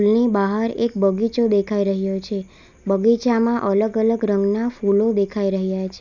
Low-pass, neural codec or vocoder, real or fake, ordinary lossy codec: 7.2 kHz; none; real; none